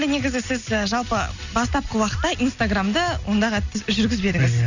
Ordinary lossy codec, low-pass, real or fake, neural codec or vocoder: none; 7.2 kHz; real; none